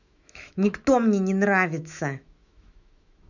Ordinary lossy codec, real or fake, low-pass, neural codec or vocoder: none; fake; 7.2 kHz; autoencoder, 48 kHz, 128 numbers a frame, DAC-VAE, trained on Japanese speech